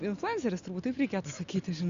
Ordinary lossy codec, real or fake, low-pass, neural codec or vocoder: Opus, 64 kbps; real; 7.2 kHz; none